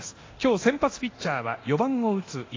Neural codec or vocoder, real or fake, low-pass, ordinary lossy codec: codec, 24 kHz, 0.9 kbps, DualCodec; fake; 7.2 kHz; AAC, 32 kbps